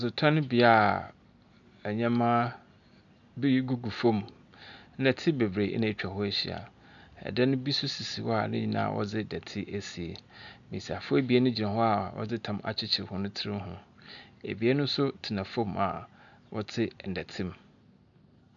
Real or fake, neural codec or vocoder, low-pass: real; none; 7.2 kHz